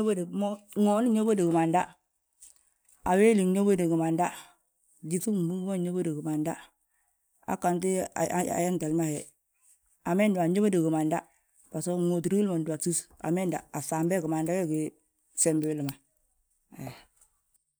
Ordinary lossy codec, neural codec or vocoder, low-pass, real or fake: none; none; none; real